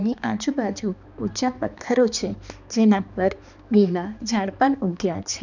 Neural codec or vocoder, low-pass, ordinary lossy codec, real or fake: codec, 16 kHz, 2 kbps, X-Codec, HuBERT features, trained on balanced general audio; 7.2 kHz; none; fake